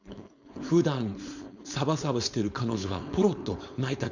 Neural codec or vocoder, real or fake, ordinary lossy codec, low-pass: codec, 16 kHz, 4.8 kbps, FACodec; fake; none; 7.2 kHz